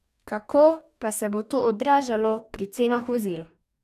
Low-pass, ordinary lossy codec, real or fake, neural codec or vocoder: 14.4 kHz; none; fake; codec, 44.1 kHz, 2.6 kbps, DAC